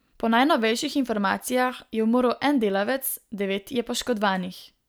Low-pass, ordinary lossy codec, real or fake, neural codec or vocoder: none; none; real; none